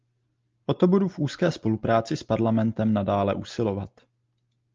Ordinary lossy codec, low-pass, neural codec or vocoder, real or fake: Opus, 24 kbps; 7.2 kHz; none; real